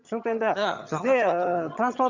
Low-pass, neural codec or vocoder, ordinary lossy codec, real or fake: 7.2 kHz; vocoder, 22.05 kHz, 80 mel bands, HiFi-GAN; Opus, 64 kbps; fake